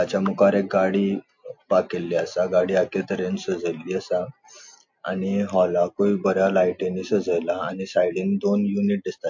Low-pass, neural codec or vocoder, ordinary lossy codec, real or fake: 7.2 kHz; none; MP3, 48 kbps; real